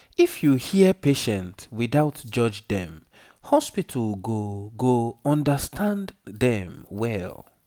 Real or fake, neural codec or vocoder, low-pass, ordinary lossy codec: real; none; none; none